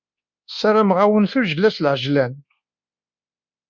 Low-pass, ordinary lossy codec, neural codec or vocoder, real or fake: 7.2 kHz; Opus, 64 kbps; codec, 24 kHz, 1.2 kbps, DualCodec; fake